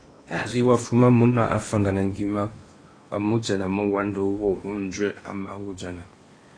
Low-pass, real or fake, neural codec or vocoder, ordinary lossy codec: 9.9 kHz; fake; codec, 16 kHz in and 24 kHz out, 0.8 kbps, FocalCodec, streaming, 65536 codes; AAC, 32 kbps